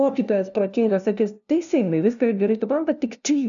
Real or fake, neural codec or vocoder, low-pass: fake; codec, 16 kHz, 0.5 kbps, FunCodec, trained on LibriTTS, 25 frames a second; 7.2 kHz